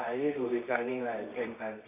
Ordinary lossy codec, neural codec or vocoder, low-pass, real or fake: none; codec, 24 kHz, 0.9 kbps, WavTokenizer, medium music audio release; 3.6 kHz; fake